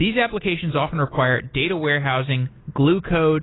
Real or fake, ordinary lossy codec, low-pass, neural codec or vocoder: real; AAC, 16 kbps; 7.2 kHz; none